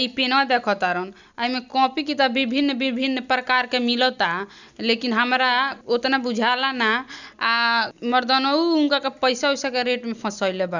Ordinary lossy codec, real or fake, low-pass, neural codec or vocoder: none; real; 7.2 kHz; none